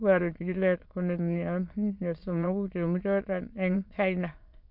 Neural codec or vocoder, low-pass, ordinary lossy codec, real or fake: autoencoder, 22.05 kHz, a latent of 192 numbers a frame, VITS, trained on many speakers; 5.4 kHz; MP3, 48 kbps; fake